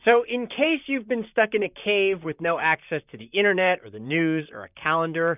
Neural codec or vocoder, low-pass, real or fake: vocoder, 44.1 kHz, 128 mel bands, Pupu-Vocoder; 3.6 kHz; fake